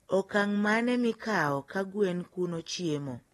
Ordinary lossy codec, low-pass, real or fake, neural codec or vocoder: AAC, 32 kbps; 19.8 kHz; real; none